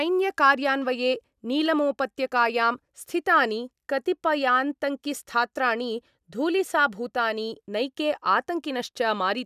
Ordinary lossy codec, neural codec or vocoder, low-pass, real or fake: none; none; 14.4 kHz; real